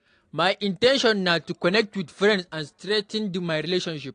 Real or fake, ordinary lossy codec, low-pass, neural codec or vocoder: real; AAC, 48 kbps; 9.9 kHz; none